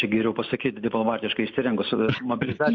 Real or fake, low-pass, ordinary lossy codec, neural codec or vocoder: real; 7.2 kHz; MP3, 64 kbps; none